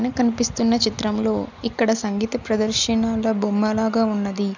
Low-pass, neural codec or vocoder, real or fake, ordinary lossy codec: 7.2 kHz; none; real; none